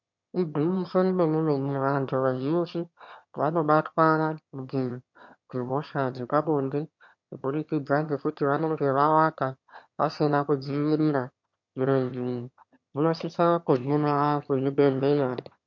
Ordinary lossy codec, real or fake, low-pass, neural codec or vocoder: MP3, 48 kbps; fake; 7.2 kHz; autoencoder, 22.05 kHz, a latent of 192 numbers a frame, VITS, trained on one speaker